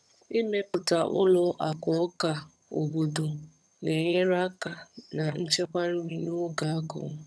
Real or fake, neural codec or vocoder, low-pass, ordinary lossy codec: fake; vocoder, 22.05 kHz, 80 mel bands, HiFi-GAN; none; none